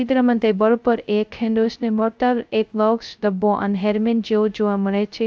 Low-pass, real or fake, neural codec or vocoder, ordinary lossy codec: none; fake; codec, 16 kHz, 0.2 kbps, FocalCodec; none